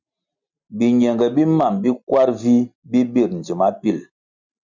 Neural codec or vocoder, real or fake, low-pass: none; real; 7.2 kHz